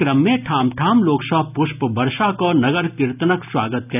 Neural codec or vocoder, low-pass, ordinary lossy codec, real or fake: none; 3.6 kHz; none; real